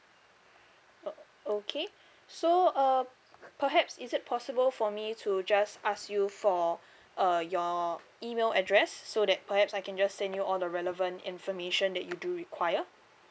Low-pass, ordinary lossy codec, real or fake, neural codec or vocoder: none; none; real; none